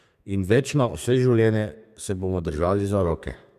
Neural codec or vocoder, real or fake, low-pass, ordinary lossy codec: codec, 32 kHz, 1.9 kbps, SNAC; fake; 14.4 kHz; none